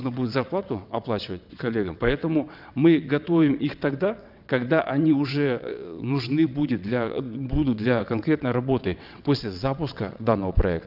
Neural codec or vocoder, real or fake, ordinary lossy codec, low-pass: vocoder, 22.05 kHz, 80 mel bands, WaveNeXt; fake; none; 5.4 kHz